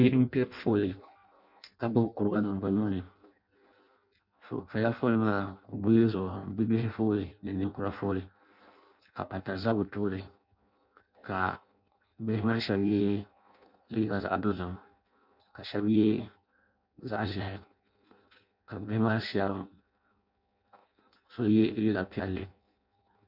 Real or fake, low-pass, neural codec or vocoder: fake; 5.4 kHz; codec, 16 kHz in and 24 kHz out, 0.6 kbps, FireRedTTS-2 codec